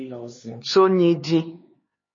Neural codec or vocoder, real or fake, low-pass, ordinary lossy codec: codec, 16 kHz, 4 kbps, X-Codec, HuBERT features, trained on LibriSpeech; fake; 7.2 kHz; MP3, 32 kbps